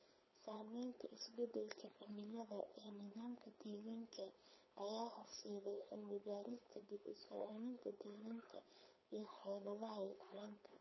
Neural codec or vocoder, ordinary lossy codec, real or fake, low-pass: codec, 16 kHz, 4.8 kbps, FACodec; MP3, 24 kbps; fake; 7.2 kHz